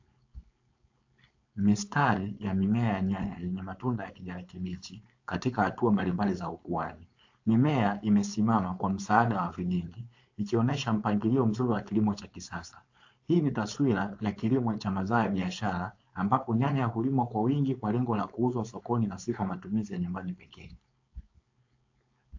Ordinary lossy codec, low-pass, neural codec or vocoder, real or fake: AAC, 48 kbps; 7.2 kHz; codec, 16 kHz, 4.8 kbps, FACodec; fake